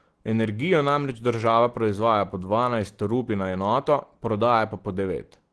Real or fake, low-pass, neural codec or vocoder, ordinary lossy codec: real; 10.8 kHz; none; Opus, 16 kbps